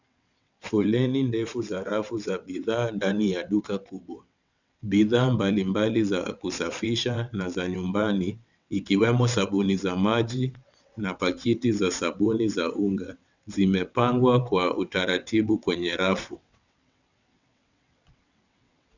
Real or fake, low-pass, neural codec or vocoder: fake; 7.2 kHz; vocoder, 22.05 kHz, 80 mel bands, WaveNeXt